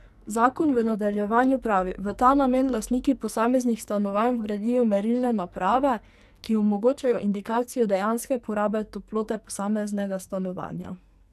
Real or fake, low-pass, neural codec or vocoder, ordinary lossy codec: fake; 14.4 kHz; codec, 44.1 kHz, 2.6 kbps, SNAC; none